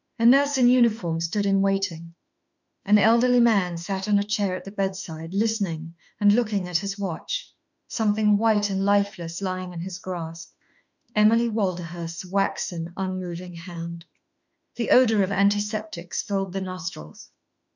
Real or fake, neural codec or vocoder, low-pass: fake; autoencoder, 48 kHz, 32 numbers a frame, DAC-VAE, trained on Japanese speech; 7.2 kHz